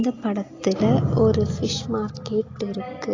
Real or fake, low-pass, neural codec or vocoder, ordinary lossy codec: real; 7.2 kHz; none; AAC, 32 kbps